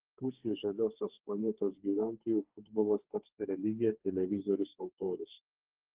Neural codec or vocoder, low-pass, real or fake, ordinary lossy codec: codec, 32 kHz, 1.9 kbps, SNAC; 3.6 kHz; fake; Opus, 16 kbps